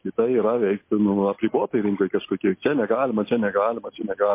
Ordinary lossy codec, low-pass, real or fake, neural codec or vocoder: MP3, 24 kbps; 3.6 kHz; real; none